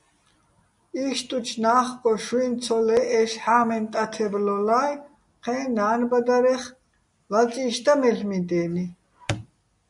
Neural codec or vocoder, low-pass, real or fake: none; 10.8 kHz; real